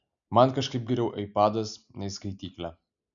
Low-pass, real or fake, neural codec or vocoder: 7.2 kHz; real; none